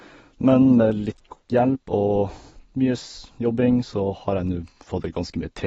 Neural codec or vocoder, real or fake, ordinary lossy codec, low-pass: none; real; AAC, 24 kbps; 19.8 kHz